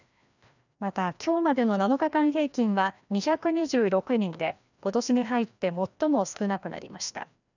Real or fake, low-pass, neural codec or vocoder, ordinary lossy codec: fake; 7.2 kHz; codec, 16 kHz, 1 kbps, FreqCodec, larger model; none